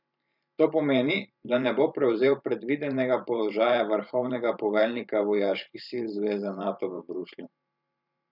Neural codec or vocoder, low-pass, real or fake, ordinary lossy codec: vocoder, 44.1 kHz, 128 mel bands every 512 samples, BigVGAN v2; 5.4 kHz; fake; none